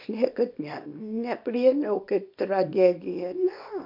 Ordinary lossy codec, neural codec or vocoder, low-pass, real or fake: MP3, 48 kbps; codec, 24 kHz, 0.9 kbps, WavTokenizer, small release; 5.4 kHz; fake